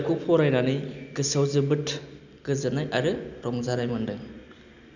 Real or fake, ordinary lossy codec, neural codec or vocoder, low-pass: real; none; none; 7.2 kHz